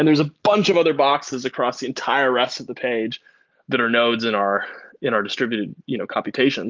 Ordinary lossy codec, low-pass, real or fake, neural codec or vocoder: Opus, 32 kbps; 7.2 kHz; real; none